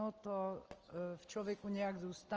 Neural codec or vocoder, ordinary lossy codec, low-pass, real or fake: none; Opus, 24 kbps; 7.2 kHz; real